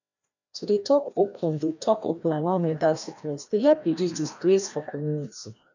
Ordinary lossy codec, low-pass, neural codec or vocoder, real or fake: none; 7.2 kHz; codec, 16 kHz, 1 kbps, FreqCodec, larger model; fake